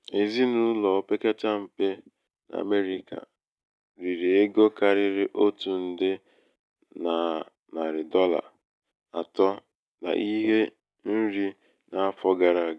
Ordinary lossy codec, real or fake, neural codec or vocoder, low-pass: none; real; none; none